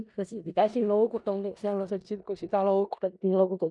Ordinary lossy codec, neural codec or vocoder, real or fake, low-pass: none; codec, 16 kHz in and 24 kHz out, 0.4 kbps, LongCat-Audio-Codec, four codebook decoder; fake; 10.8 kHz